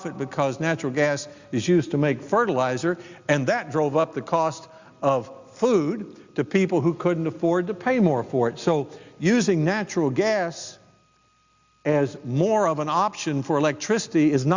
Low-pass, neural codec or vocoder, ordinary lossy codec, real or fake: 7.2 kHz; none; Opus, 64 kbps; real